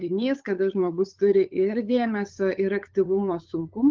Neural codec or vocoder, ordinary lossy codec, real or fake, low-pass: codec, 16 kHz, 8 kbps, FunCodec, trained on LibriTTS, 25 frames a second; Opus, 32 kbps; fake; 7.2 kHz